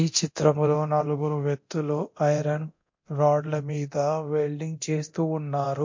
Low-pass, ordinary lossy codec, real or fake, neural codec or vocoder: 7.2 kHz; MP3, 48 kbps; fake; codec, 24 kHz, 0.9 kbps, DualCodec